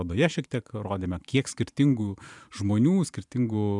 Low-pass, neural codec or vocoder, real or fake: 10.8 kHz; vocoder, 44.1 kHz, 128 mel bands every 512 samples, BigVGAN v2; fake